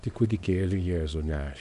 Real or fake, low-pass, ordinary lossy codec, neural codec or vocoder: fake; 10.8 kHz; MP3, 64 kbps; codec, 24 kHz, 0.9 kbps, WavTokenizer, small release